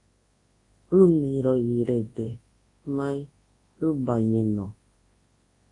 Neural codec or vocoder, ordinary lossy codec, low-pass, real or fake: codec, 24 kHz, 0.9 kbps, WavTokenizer, large speech release; AAC, 32 kbps; 10.8 kHz; fake